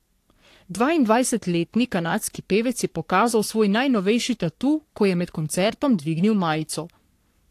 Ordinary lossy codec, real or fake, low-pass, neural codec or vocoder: AAC, 64 kbps; fake; 14.4 kHz; codec, 44.1 kHz, 3.4 kbps, Pupu-Codec